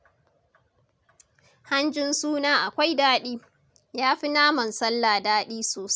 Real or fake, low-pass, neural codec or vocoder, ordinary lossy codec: real; none; none; none